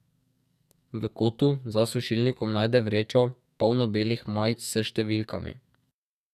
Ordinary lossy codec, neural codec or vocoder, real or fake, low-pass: none; codec, 44.1 kHz, 2.6 kbps, SNAC; fake; 14.4 kHz